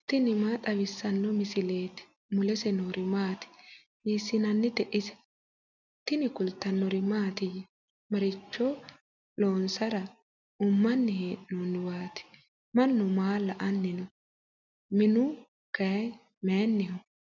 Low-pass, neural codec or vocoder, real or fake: 7.2 kHz; none; real